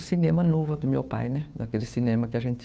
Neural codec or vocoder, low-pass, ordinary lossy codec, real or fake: codec, 16 kHz, 2 kbps, FunCodec, trained on Chinese and English, 25 frames a second; none; none; fake